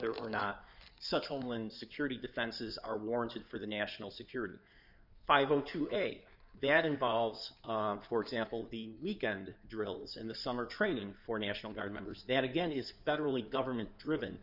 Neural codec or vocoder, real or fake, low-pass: codec, 16 kHz in and 24 kHz out, 2.2 kbps, FireRedTTS-2 codec; fake; 5.4 kHz